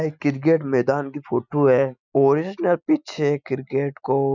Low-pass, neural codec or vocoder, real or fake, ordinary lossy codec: 7.2 kHz; none; real; none